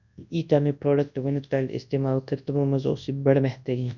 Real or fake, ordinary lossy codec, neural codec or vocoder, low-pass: fake; none; codec, 24 kHz, 0.9 kbps, WavTokenizer, large speech release; 7.2 kHz